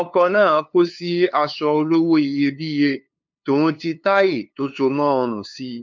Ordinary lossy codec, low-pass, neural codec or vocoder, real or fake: MP3, 64 kbps; 7.2 kHz; codec, 16 kHz, 2 kbps, FunCodec, trained on LibriTTS, 25 frames a second; fake